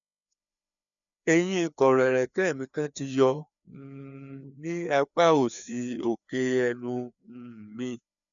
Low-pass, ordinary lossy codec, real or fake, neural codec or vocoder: 7.2 kHz; none; fake; codec, 16 kHz, 2 kbps, FreqCodec, larger model